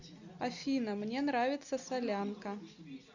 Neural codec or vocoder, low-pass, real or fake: none; 7.2 kHz; real